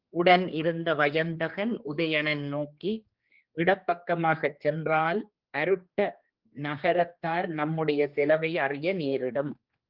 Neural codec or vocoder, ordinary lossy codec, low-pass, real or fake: codec, 16 kHz, 2 kbps, X-Codec, HuBERT features, trained on general audio; Opus, 24 kbps; 5.4 kHz; fake